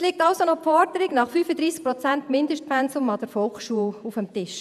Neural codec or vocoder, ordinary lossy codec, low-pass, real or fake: vocoder, 48 kHz, 128 mel bands, Vocos; none; 14.4 kHz; fake